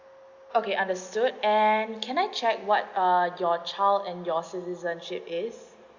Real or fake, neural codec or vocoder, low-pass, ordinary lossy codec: real; none; 7.2 kHz; Opus, 64 kbps